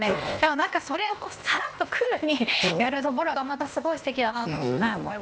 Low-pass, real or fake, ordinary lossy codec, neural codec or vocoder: none; fake; none; codec, 16 kHz, 0.8 kbps, ZipCodec